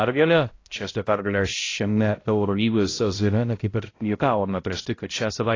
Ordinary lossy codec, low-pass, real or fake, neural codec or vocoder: AAC, 32 kbps; 7.2 kHz; fake; codec, 16 kHz, 0.5 kbps, X-Codec, HuBERT features, trained on balanced general audio